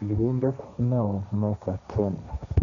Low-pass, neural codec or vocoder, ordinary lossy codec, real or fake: 7.2 kHz; codec, 16 kHz, 1.1 kbps, Voila-Tokenizer; none; fake